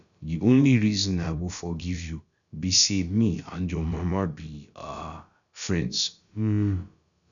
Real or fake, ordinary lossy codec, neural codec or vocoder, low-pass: fake; none; codec, 16 kHz, about 1 kbps, DyCAST, with the encoder's durations; 7.2 kHz